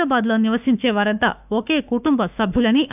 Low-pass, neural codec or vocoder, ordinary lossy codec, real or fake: 3.6 kHz; autoencoder, 48 kHz, 32 numbers a frame, DAC-VAE, trained on Japanese speech; none; fake